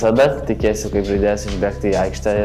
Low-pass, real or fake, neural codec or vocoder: 14.4 kHz; real; none